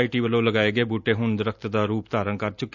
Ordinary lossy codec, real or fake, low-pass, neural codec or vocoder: none; real; 7.2 kHz; none